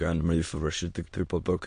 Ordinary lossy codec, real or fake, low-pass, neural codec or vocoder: MP3, 48 kbps; fake; 9.9 kHz; autoencoder, 22.05 kHz, a latent of 192 numbers a frame, VITS, trained on many speakers